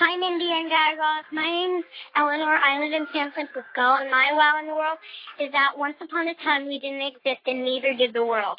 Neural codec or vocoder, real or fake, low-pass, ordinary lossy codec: codec, 44.1 kHz, 3.4 kbps, Pupu-Codec; fake; 5.4 kHz; AAC, 32 kbps